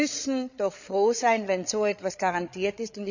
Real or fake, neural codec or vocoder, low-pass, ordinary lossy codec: fake; codec, 16 kHz, 8 kbps, FreqCodec, larger model; 7.2 kHz; none